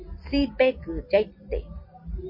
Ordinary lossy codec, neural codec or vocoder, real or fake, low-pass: MP3, 24 kbps; none; real; 5.4 kHz